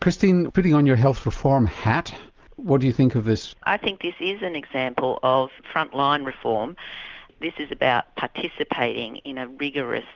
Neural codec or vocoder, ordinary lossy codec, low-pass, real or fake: none; Opus, 32 kbps; 7.2 kHz; real